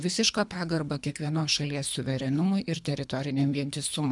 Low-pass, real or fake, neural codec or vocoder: 10.8 kHz; fake; codec, 24 kHz, 3 kbps, HILCodec